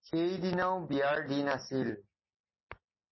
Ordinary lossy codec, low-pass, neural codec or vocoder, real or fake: MP3, 24 kbps; 7.2 kHz; none; real